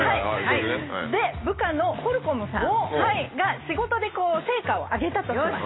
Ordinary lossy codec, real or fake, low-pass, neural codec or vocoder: AAC, 16 kbps; real; 7.2 kHz; none